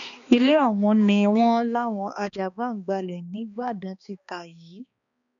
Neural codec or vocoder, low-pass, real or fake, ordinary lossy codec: codec, 16 kHz, 2 kbps, X-Codec, HuBERT features, trained on balanced general audio; 7.2 kHz; fake; none